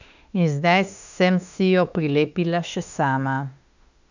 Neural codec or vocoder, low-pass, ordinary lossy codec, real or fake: autoencoder, 48 kHz, 32 numbers a frame, DAC-VAE, trained on Japanese speech; 7.2 kHz; none; fake